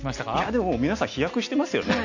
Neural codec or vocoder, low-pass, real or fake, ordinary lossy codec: none; 7.2 kHz; real; AAC, 48 kbps